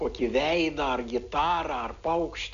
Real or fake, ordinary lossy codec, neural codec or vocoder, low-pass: real; MP3, 96 kbps; none; 7.2 kHz